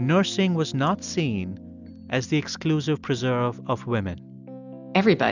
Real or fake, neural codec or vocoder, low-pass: real; none; 7.2 kHz